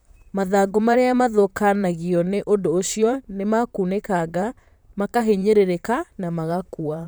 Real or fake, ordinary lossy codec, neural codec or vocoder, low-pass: fake; none; vocoder, 44.1 kHz, 128 mel bands, Pupu-Vocoder; none